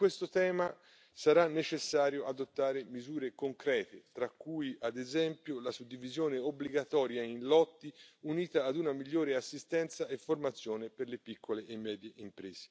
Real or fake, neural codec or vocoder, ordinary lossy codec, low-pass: real; none; none; none